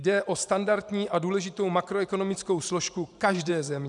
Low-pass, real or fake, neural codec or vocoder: 10.8 kHz; fake; vocoder, 44.1 kHz, 128 mel bands every 512 samples, BigVGAN v2